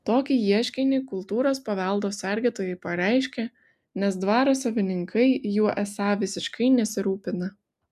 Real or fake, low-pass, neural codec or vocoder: real; 14.4 kHz; none